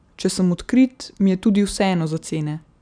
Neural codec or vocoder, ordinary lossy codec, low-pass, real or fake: none; none; 9.9 kHz; real